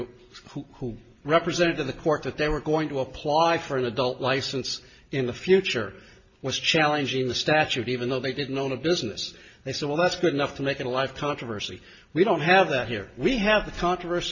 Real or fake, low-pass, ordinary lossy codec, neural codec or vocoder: real; 7.2 kHz; MP3, 32 kbps; none